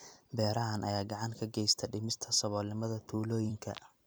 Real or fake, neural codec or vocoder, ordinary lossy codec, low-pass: fake; vocoder, 44.1 kHz, 128 mel bands every 256 samples, BigVGAN v2; none; none